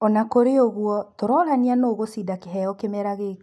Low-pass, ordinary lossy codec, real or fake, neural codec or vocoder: none; none; real; none